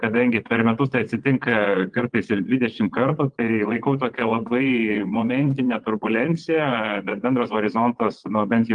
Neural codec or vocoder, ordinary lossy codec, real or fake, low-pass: vocoder, 22.05 kHz, 80 mel bands, WaveNeXt; Opus, 24 kbps; fake; 9.9 kHz